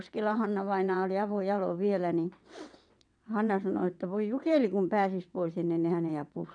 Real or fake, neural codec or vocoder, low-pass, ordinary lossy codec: fake; vocoder, 22.05 kHz, 80 mel bands, WaveNeXt; 9.9 kHz; none